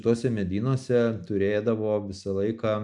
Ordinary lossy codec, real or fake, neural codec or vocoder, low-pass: MP3, 96 kbps; real; none; 10.8 kHz